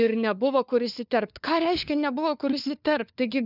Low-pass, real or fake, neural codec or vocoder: 5.4 kHz; fake; codec, 16 kHz, 4 kbps, FunCodec, trained on LibriTTS, 50 frames a second